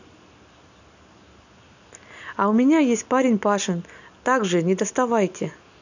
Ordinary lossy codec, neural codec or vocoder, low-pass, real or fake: none; none; 7.2 kHz; real